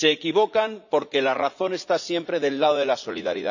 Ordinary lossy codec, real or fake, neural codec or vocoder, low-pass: none; fake; vocoder, 44.1 kHz, 80 mel bands, Vocos; 7.2 kHz